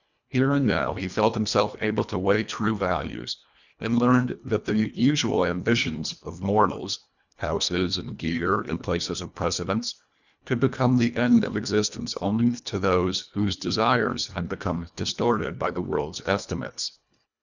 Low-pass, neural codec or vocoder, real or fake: 7.2 kHz; codec, 24 kHz, 1.5 kbps, HILCodec; fake